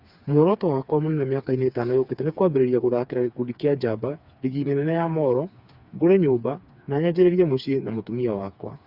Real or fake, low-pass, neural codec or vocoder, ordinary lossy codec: fake; 5.4 kHz; codec, 16 kHz, 4 kbps, FreqCodec, smaller model; Opus, 64 kbps